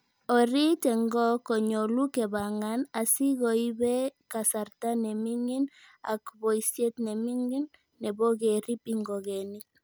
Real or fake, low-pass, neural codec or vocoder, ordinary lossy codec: real; none; none; none